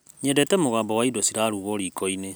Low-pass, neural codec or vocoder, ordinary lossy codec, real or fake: none; none; none; real